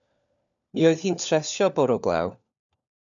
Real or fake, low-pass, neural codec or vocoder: fake; 7.2 kHz; codec, 16 kHz, 4 kbps, FunCodec, trained on LibriTTS, 50 frames a second